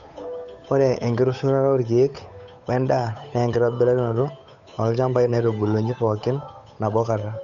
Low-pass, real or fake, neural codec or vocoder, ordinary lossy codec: 7.2 kHz; fake; codec, 16 kHz, 8 kbps, FunCodec, trained on Chinese and English, 25 frames a second; none